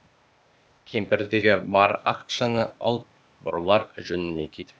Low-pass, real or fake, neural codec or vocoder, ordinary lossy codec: none; fake; codec, 16 kHz, 0.8 kbps, ZipCodec; none